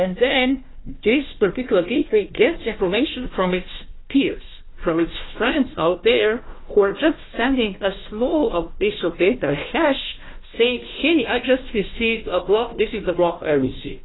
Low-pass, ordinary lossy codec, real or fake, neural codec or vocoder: 7.2 kHz; AAC, 16 kbps; fake; codec, 16 kHz, 1 kbps, FunCodec, trained on Chinese and English, 50 frames a second